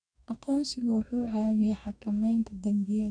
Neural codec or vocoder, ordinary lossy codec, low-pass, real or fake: codec, 44.1 kHz, 2.6 kbps, DAC; MP3, 96 kbps; 9.9 kHz; fake